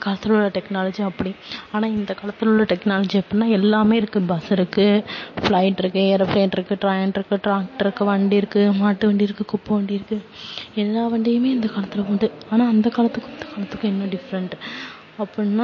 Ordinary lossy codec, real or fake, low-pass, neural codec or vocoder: MP3, 32 kbps; real; 7.2 kHz; none